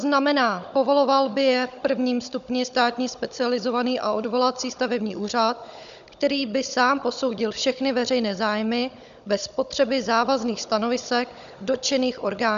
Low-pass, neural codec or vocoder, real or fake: 7.2 kHz; codec, 16 kHz, 16 kbps, FunCodec, trained on Chinese and English, 50 frames a second; fake